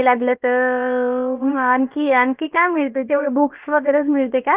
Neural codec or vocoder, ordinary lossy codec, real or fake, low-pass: codec, 16 kHz, 0.7 kbps, FocalCodec; Opus, 32 kbps; fake; 3.6 kHz